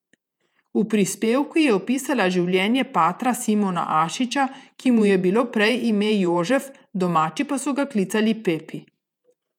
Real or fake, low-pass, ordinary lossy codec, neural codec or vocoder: fake; 19.8 kHz; none; vocoder, 48 kHz, 128 mel bands, Vocos